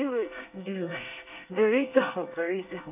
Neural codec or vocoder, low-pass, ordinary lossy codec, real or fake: codec, 24 kHz, 1 kbps, SNAC; 3.6 kHz; AAC, 32 kbps; fake